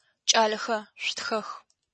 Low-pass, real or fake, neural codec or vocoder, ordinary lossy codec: 10.8 kHz; real; none; MP3, 32 kbps